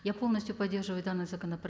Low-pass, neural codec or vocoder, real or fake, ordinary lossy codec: none; none; real; none